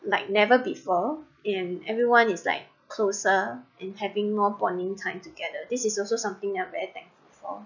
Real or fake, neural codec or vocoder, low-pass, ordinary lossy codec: real; none; 7.2 kHz; none